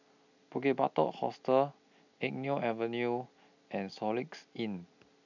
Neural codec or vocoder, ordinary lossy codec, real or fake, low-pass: none; none; real; 7.2 kHz